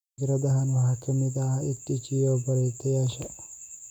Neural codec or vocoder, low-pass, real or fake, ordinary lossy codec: none; 19.8 kHz; real; none